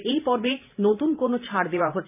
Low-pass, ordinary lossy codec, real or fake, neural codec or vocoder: 3.6 kHz; none; fake; vocoder, 44.1 kHz, 128 mel bands every 512 samples, BigVGAN v2